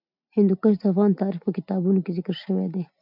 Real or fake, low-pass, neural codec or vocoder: real; 5.4 kHz; none